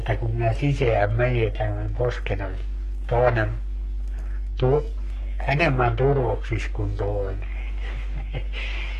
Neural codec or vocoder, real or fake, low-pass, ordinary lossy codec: codec, 44.1 kHz, 3.4 kbps, Pupu-Codec; fake; 14.4 kHz; MP3, 64 kbps